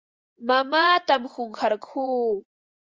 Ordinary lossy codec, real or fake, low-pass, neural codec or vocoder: Opus, 32 kbps; fake; 7.2 kHz; vocoder, 24 kHz, 100 mel bands, Vocos